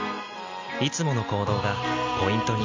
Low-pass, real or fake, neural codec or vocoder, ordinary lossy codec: 7.2 kHz; real; none; MP3, 64 kbps